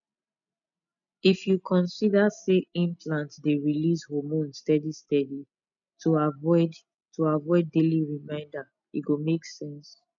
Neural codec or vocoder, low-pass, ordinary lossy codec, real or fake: none; 7.2 kHz; none; real